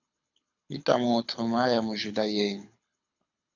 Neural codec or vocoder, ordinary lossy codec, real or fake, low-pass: codec, 24 kHz, 6 kbps, HILCodec; AAC, 48 kbps; fake; 7.2 kHz